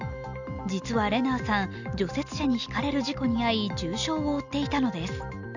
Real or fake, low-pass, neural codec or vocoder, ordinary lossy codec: real; 7.2 kHz; none; none